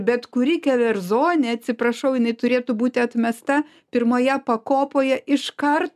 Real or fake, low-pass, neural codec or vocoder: real; 14.4 kHz; none